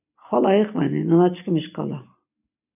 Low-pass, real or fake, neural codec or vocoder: 3.6 kHz; real; none